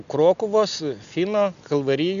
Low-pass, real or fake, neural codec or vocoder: 7.2 kHz; real; none